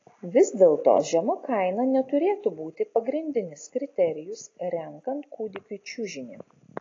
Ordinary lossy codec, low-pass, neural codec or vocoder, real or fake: AAC, 32 kbps; 7.2 kHz; none; real